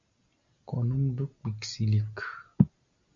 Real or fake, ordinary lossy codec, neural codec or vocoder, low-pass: real; MP3, 64 kbps; none; 7.2 kHz